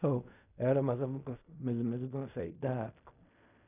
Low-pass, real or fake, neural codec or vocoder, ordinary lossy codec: 3.6 kHz; fake; codec, 16 kHz in and 24 kHz out, 0.4 kbps, LongCat-Audio-Codec, fine tuned four codebook decoder; none